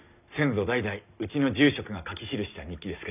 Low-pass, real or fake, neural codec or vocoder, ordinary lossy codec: 3.6 kHz; real; none; none